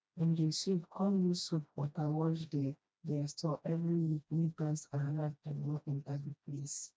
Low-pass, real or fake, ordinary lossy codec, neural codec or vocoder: none; fake; none; codec, 16 kHz, 1 kbps, FreqCodec, smaller model